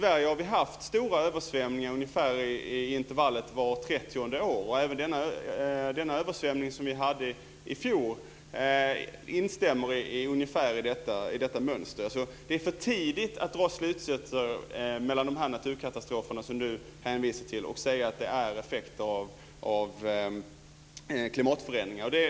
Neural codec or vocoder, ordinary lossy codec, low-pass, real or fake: none; none; none; real